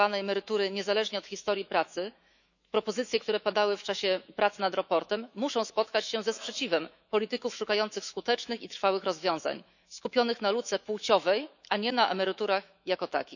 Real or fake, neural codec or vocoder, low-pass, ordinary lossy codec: fake; autoencoder, 48 kHz, 128 numbers a frame, DAC-VAE, trained on Japanese speech; 7.2 kHz; none